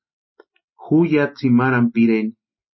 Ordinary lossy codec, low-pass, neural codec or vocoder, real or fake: MP3, 24 kbps; 7.2 kHz; none; real